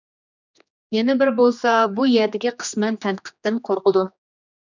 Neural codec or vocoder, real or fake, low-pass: codec, 16 kHz, 2 kbps, X-Codec, HuBERT features, trained on general audio; fake; 7.2 kHz